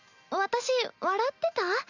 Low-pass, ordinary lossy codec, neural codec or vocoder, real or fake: 7.2 kHz; none; none; real